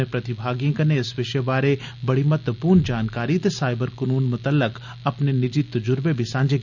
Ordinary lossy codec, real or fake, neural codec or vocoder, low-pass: none; real; none; 7.2 kHz